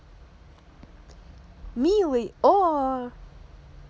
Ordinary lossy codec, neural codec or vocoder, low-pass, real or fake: none; none; none; real